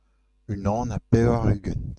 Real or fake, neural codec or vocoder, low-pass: real; none; 10.8 kHz